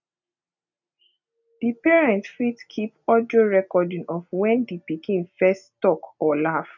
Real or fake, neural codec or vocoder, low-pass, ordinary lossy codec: real; none; 7.2 kHz; none